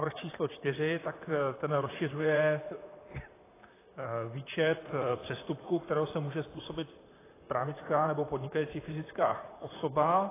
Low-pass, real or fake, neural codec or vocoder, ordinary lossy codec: 3.6 kHz; fake; vocoder, 44.1 kHz, 128 mel bands, Pupu-Vocoder; AAC, 16 kbps